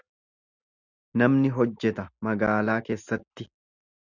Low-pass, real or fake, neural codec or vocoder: 7.2 kHz; real; none